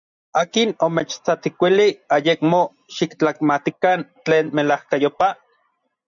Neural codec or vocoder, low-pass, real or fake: none; 7.2 kHz; real